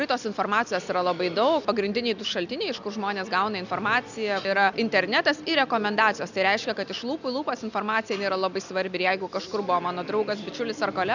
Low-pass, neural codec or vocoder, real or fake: 7.2 kHz; none; real